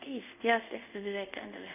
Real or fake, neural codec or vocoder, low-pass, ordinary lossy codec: fake; codec, 24 kHz, 0.5 kbps, DualCodec; 3.6 kHz; none